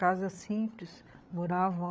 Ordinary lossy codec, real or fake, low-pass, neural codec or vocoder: none; fake; none; codec, 16 kHz, 8 kbps, FreqCodec, larger model